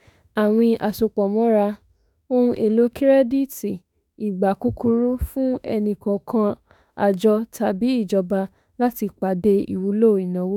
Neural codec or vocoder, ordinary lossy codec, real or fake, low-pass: autoencoder, 48 kHz, 32 numbers a frame, DAC-VAE, trained on Japanese speech; none; fake; 19.8 kHz